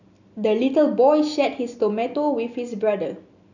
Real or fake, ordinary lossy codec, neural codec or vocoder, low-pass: real; none; none; 7.2 kHz